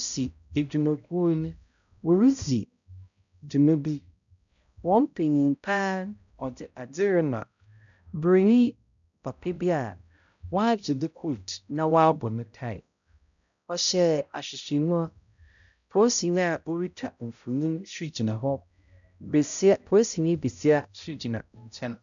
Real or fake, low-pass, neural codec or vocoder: fake; 7.2 kHz; codec, 16 kHz, 0.5 kbps, X-Codec, HuBERT features, trained on balanced general audio